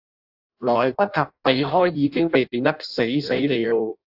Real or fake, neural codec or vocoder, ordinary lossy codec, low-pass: fake; codec, 16 kHz in and 24 kHz out, 0.6 kbps, FireRedTTS-2 codec; AAC, 48 kbps; 5.4 kHz